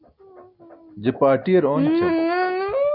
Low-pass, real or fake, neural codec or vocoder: 5.4 kHz; real; none